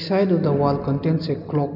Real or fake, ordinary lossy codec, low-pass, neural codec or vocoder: real; none; 5.4 kHz; none